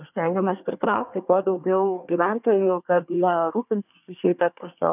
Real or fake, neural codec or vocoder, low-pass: fake; codec, 24 kHz, 1 kbps, SNAC; 3.6 kHz